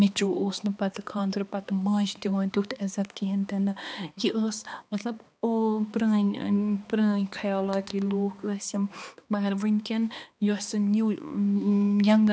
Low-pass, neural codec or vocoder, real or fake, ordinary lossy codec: none; codec, 16 kHz, 2 kbps, X-Codec, HuBERT features, trained on balanced general audio; fake; none